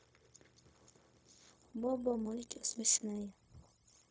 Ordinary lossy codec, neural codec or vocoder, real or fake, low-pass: none; codec, 16 kHz, 0.4 kbps, LongCat-Audio-Codec; fake; none